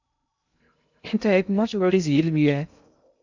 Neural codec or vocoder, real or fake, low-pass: codec, 16 kHz in and 24 kHz out, 0.6 kbps, FocalCodec, streaming, 2048 codes; fake; 7.2 kHz